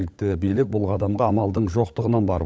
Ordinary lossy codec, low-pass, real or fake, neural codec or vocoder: none; none; fake; codec, 16 kHz, 16 kbps, FunCodec, trained on LibriTTS, 50 frames a second